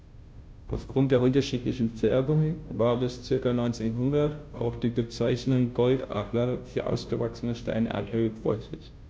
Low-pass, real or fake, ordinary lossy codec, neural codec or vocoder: none; fake; none; codec, 16 kHz, 0.5 kbps, FunCodec, trained on Chinese and English, 25 frames a second